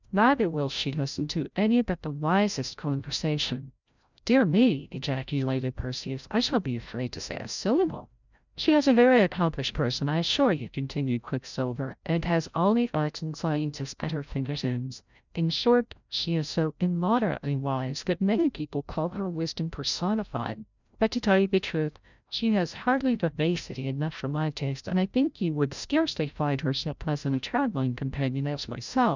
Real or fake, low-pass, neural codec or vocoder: fake; 7.2 kHz; codec, 16 kHz, 0.5 kbps, FreqCodec, larger model